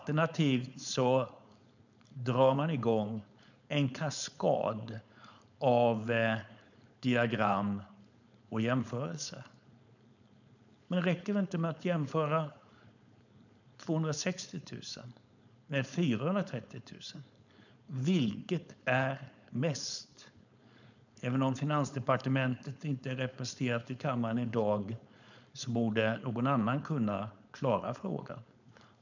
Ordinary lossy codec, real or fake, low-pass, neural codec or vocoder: none; fake; 7.2 kHz; codec, 16 kHz, 4.8 kbps, FACodec